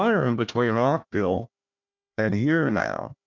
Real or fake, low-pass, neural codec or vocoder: fake; 7.2 kHz; codec, 16 kHz, 1 kbps, FunCodec, trained on Chinese and English, 50 frames a second